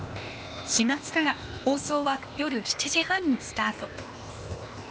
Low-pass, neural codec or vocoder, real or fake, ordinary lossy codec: none; codec, 16 kHz, 0.8 kbps, ZipCodec; fake; none